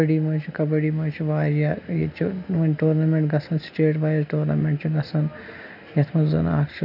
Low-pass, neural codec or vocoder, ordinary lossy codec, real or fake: 5.4 kHz; none; none; real